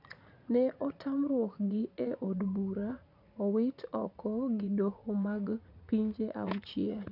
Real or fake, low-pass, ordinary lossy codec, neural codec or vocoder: fake; 5.4 kHz; none; vocoder, 44.1 kHz, 80 mel bands, Vocos